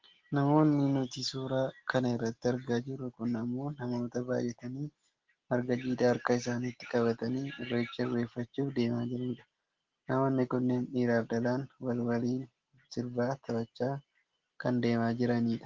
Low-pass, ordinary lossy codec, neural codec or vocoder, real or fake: 7.2 kHz; Opus, 16 kbps; none; real